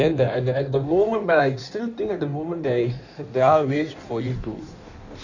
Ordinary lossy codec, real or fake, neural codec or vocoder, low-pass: none; fake; codec, 16 kHz in and 24 kHz out, 1.1 kbps, FireRedTTS-2 codec; 7.2 kHz